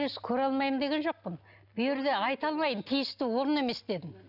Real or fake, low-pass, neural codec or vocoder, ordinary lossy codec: real; 5.4 kHz; none; none